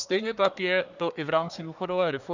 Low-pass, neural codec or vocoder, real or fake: 7.2 kHz; codec, 24 kHz, 1 kbps, SNAC; fake